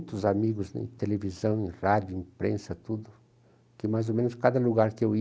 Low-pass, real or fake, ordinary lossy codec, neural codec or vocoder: none; real; none; none